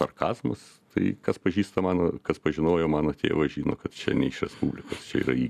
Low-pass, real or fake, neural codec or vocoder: 14.4 kHz; real; none